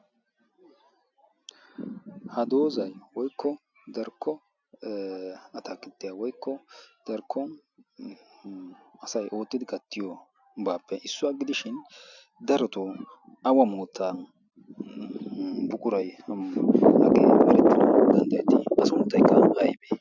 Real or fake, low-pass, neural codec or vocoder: real; 7.2 kHz; none